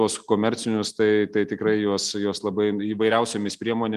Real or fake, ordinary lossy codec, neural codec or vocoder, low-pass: real; Opus, 32 kbps; none; 14.4 kHz